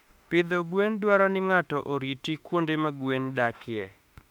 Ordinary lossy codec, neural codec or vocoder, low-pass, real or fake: MP3, 96 kbps; autoencoder, 48 kHz, 32 numbers a frame, DAC-VAE, trained on Japanese speech; 19.8 kHz; fake